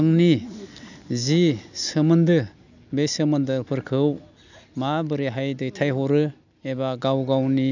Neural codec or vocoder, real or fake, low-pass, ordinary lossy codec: none; real; 7.2 kHz; none